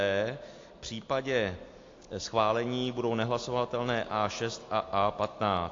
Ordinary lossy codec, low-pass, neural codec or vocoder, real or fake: AAC, 48 kbps; 7.2 kHz; none; real